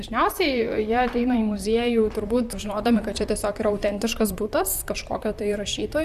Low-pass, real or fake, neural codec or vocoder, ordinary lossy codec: 14.4 kHz; fake; vocoder, 44.1 kHz, 128 mel bands, Pupu-Vocoder; Opus, 64 kbps